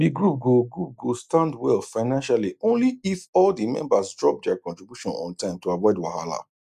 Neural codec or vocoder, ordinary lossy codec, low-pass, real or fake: none; none; 14.4 kHz; real